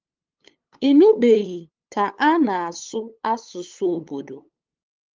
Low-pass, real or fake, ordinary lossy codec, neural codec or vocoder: 7.2 kHz; fake; Opus, 32 kbps; codec, 16 kHz, 8 kbps, FunCodec, trained on LibriTTS, 25 frames a second